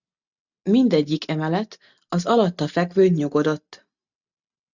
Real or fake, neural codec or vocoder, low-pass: real; none; 7.2 kHz